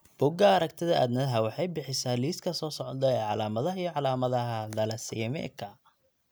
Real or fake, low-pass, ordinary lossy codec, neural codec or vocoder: real; none; none; none